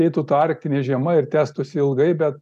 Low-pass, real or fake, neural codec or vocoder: 14.4 kHz; real; none